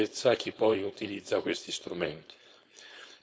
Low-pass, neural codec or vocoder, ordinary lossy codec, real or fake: none; codec, 16 kHz, 4.8 kbps, FACodec; none; fake